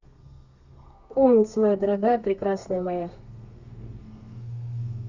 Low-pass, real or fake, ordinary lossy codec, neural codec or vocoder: 7.2 kHz; fake; Opus, 64 kbps; codec, 32 kHz, 1.9 kbps, SNAC